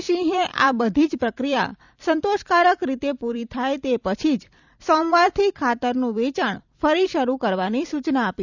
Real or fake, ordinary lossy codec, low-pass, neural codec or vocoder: fake; none; 7.2 kHz; vocoder, 44.1 kHz, 128 mel bands every 256 samples, BigVGAN v2